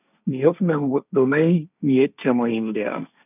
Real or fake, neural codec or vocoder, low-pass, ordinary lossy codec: fake; codec, 16 kHz, 1.1 kbps, Voila-Tokenizer; 3.6 kHz; none